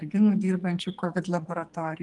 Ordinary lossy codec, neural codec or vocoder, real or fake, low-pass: Opus, 32 kbps; codec, 44.1 kHz, 2.6 kbps, SNAC; fake; 10.8 kHz